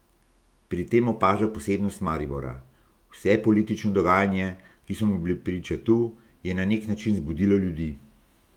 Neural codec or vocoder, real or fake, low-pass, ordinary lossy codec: autoencoder, 48 kHz, 128 numbers a frame, DAC-VAE, trained on Japanese speech; fake; 19.8 kHz; Opus, 32 kbps